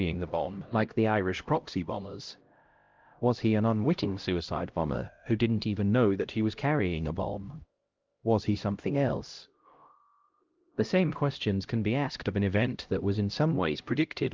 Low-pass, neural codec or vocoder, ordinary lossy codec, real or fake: 7.2 kHz; codec, 16 kHz, 0.5 kbps, X-Codec, HuBERT features, trained on LibriSpeech; Opus, 32 kbps; fake